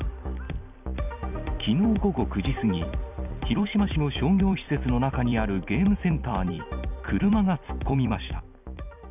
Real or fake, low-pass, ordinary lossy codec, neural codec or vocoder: fake; 3.6 kHz; none; vocoder, 44.1 kHz, 128 mel bands every 256 samples, BigVGAN v2